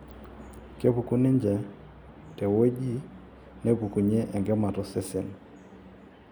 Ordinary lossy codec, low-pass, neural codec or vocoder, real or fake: none; none; none; real